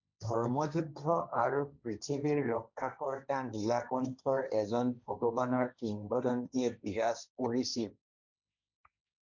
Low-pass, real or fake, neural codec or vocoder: 7.2 kHz; fake; codec, 16 kHz, 1.1 kbps, Voila-Tokenizer